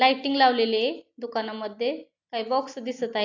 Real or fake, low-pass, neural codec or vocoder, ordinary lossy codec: real; 7.2 kHz; none; AAC, 48 kbps